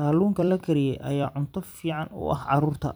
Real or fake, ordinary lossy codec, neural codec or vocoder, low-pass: fake; none; vocoder, 44.1 kHz, 128 mel bands every 256 samples, BigVGAN v2; none